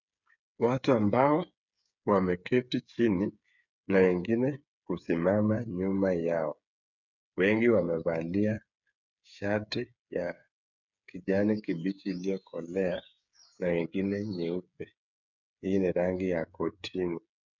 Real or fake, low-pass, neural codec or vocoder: fake; 7.2 kHz; codec, 16 kHz, 8 kbps, FreqCodec, smaller model